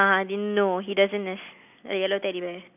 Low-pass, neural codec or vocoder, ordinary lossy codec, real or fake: 3.6 kHz; none; none; real